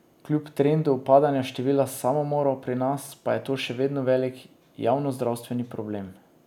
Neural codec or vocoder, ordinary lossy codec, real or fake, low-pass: none; none; real; 19.8 kHz